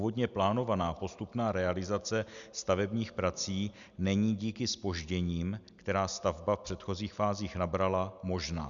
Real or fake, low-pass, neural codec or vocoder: real; 7.2 kHz; none